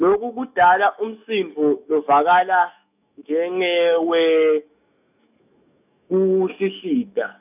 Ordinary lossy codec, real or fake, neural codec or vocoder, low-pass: none; fake; autoencoder, 48 kHz, 128 numbers a frame, DAC-VAE, trained on Japanese speech; 3.6 kHz